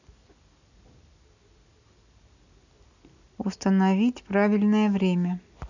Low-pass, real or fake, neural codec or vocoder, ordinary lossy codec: 7.2 kHz; real; none; none